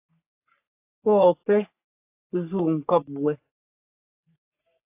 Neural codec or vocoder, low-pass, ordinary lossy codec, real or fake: codec, 44.1 kHz, 3.4 kbps, Pupu-Codec; 3.6 kHz; AAC, 32 kbps; fake